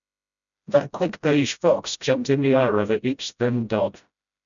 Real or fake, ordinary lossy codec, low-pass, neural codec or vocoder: fake; none; 7.2 kHz; codec, 16 kHz, 0.5 kbps, FreqCodec, smaller model